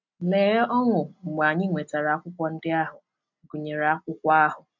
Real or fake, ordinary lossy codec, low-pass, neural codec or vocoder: real; none; 7.2 kHz; none